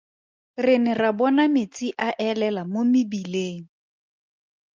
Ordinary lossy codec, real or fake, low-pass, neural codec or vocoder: Opus, 32 kbps; real; 7.2 kHz; none